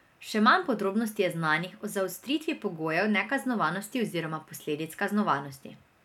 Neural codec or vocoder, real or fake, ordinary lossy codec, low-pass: none; real; none; 19.8 kHz